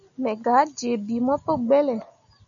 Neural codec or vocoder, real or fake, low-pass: none; real; 7.2 kHz